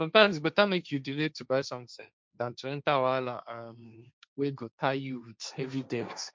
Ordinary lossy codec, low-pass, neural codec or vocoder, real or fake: none; none; codec, 16 kHz, 1.1 kbps, Voila-Tokenizer; fake